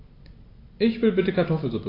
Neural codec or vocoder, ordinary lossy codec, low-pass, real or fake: none; AAC, 24 kbps; 5.4 kHz; real